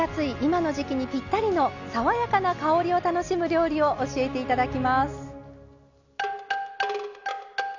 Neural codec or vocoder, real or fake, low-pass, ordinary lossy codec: none; real; 7.2 kHz; none